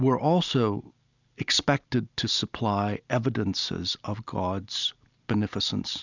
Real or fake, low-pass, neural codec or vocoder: real; 7.2 kHz; none